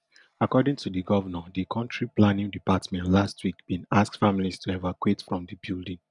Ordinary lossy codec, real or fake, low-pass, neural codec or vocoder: none; real; 10.8 kHz; none